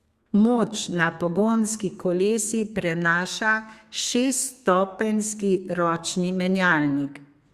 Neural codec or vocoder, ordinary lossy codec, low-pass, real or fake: codec, 32 kHz, 1.9 kbps, SNAC; Opus, 64 kbps; 14.4 kHz; fake